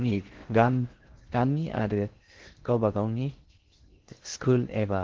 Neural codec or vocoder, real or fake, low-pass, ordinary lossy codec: codec, 16 kHz in and 24 kHz out, 0.6 kbps, FocalCodec, streaming, 4096 codes; fake; 7.2 kHz; Opus, 16 kbps